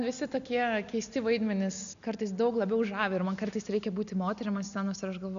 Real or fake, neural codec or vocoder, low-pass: real; none; 7.2 kHz